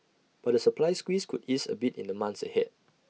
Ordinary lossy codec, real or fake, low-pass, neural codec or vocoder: none; real; none; none